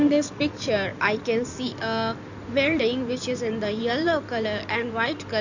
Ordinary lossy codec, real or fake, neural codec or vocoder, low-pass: none; fake; codec, 16 kHz in and 24 kHz out, 2.2 kbps, FireRedTTS-2 codec; 7.2 kHz